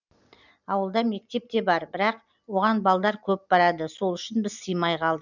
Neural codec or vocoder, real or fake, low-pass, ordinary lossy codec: none; real; 7.2 kHz; none